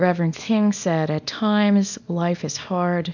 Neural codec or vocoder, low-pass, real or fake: codec, 24 kHz, 0.9 kbps, WavTokenizer, small release; 7.2 kHz; fake